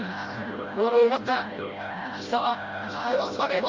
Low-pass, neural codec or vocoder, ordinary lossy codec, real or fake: 7.2 kHz; codec, 16 kHz, 0.5 kbps, FreqCodec, smaller model; Opus, 32 kbps; fake